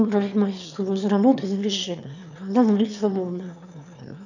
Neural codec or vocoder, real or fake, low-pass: autoencoder, 22.05 kHz, a latent of 192 numbers a frame, VITS, trained on one speaker; fake; 7.2 kHz